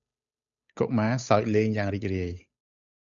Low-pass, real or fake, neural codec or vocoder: 7.2 kHz; fake; codec, 16 kHz, 8 kbps, FunCodec, trained on Chinese and English, 25 frames a second